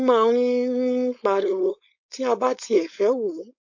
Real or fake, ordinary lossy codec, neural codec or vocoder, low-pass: fake; AAC, 48 kbps; codec, 16 kHz, 4.8 kbps, FACodec; 7.2 kHz